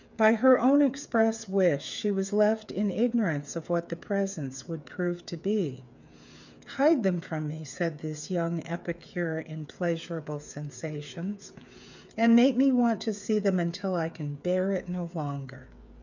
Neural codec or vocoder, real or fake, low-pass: codec, 16 kHz, 8 kbps, FreqCodec, smaller model; fake; 7.2 kHz